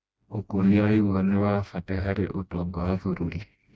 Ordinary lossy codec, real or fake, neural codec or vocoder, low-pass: none; fake; codec, 16 kHz, 1 kbps, FreqCodec, smaller model; none